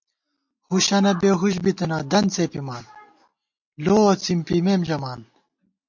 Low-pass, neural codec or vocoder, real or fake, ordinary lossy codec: 7.2 kHz; none; real; MP3, 48 kbps